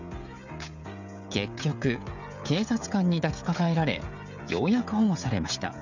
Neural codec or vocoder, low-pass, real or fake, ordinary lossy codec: codec, 16 kHz, 16 kbps, FreqCodec, smaller model; 7.2 kHz; fake; none